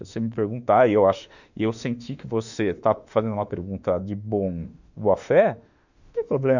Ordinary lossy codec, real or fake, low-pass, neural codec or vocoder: none; fake; 7.2 kHz; autoencoder, 48 kHz, 32 numbers a frame, DAC-VAE, trained on Japanese speech